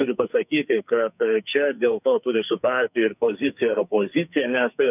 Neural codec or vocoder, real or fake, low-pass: codec, 44.1 kHz, 2.6 kbps, SNAC; fake; 3.6 kHz